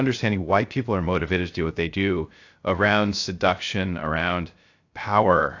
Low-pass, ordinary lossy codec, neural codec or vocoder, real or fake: 7.2 kHz; AAC, 48 kbps; codec, 16 kHz, 0.3 kbps, FocalCodec; fake